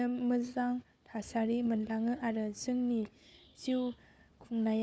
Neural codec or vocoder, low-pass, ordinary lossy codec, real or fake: codec, 16 kHz, 4 kbps, FunCodec, trained on Chinese and English, 50 frames a second; none; none; fake